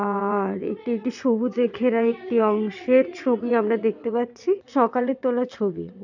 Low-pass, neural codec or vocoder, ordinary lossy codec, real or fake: 7.2 kHz; vocoder, 22.05 kHz, 80 mel bands, WaveNeXt; none; fake